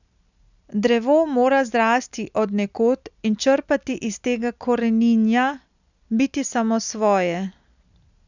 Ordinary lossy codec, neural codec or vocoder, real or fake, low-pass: none; none; real; 7.2 kHz